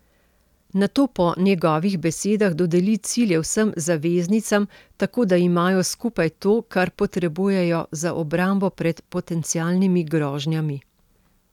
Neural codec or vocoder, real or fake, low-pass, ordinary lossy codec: none; real; 19.8 kHz; none